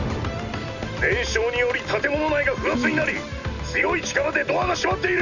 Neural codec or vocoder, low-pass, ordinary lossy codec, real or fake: none; 7.2 kHz; none; real